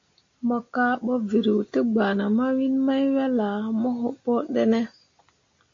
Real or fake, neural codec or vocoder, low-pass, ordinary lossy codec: real; none; 7.2 kHz; AAC, 48 kbps